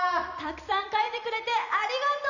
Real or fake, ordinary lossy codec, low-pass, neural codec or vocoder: fake; none; 7.2 kHz; vocoder, 44.1 kHz, 128 mel bands every 256 samples, BigVGAN v2